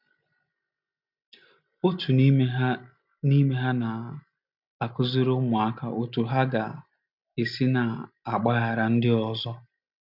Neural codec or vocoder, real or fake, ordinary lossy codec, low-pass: none; real; none; 5.4 kHz